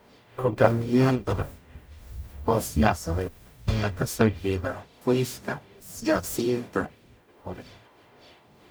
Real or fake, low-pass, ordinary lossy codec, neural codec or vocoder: fake; none; none; codec, 44.1 kHz, 0.9 kbps, DAC